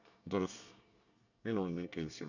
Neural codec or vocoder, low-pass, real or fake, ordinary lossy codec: codec, 24 kHz, 1 kbps, SNAC; 7.2 kHz; fake; none